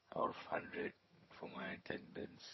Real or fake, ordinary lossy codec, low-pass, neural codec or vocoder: fake; MP3, 24 kbps; 7.2 kHz; vocoder, 22.05 kHz, 80 mel bands, HiFi-GAN